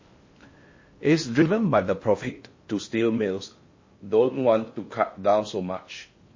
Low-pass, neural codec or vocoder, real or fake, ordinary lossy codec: 7.2 kHz; codec, 16 kHz in and 24 kHz out, 0.6 kbps, FocalCodec, streaming, 4096 codes; fake; MP3, 32 kbps